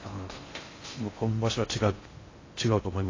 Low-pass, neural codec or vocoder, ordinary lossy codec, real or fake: 7.2 kHz; codec, 16 kHz in and 24 kHz out, 0.6 kbps, FocalCodec, streaming, 4096 codes; AAC, 32 kbps; fake